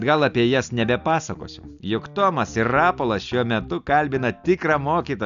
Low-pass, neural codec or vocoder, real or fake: 7.2 kHz; none; real